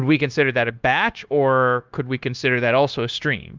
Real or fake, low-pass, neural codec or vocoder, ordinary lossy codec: fake; 7.2 kHz; codec, 16 kHz, 0.9 kbps, LongCat-Audio-Codec; Opus, 24 kbps